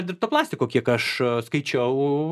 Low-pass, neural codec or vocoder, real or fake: 14.4 kHz; none; real